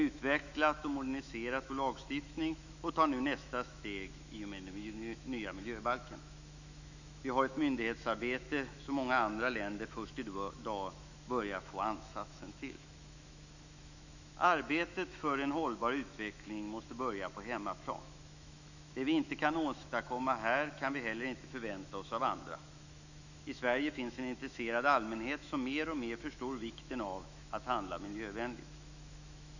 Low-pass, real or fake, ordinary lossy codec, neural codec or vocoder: 7.2 kHz; fake; none; autoencoder, 48 kHz, 128 numbers a frame, DAC-VAE, trained on Japanese speech